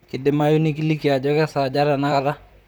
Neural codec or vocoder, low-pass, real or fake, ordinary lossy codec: vocoder, 44.1 kHz, 128 mel bands, Pupu-Vocoder; none; fake; none